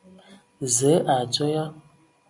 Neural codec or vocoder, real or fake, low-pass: none; real; 10.8 kHz